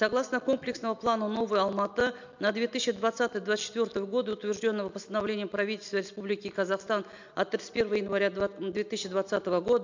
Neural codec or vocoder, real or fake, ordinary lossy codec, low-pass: none; real; MP3, 64 kbps; 7.2 kHz